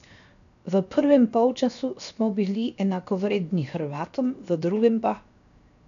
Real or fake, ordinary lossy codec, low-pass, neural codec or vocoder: fake; none; 7.2 kHz; codec, 16 kHz, 0.7 kbps, FocalCodec